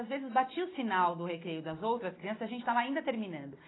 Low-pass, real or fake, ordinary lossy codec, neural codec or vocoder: 7.2 kHz; real; AAC, 16 kbps; none